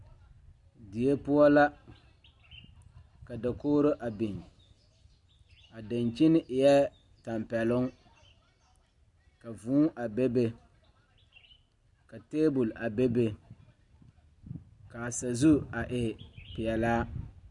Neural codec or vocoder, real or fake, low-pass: none; real; 10.8 kHz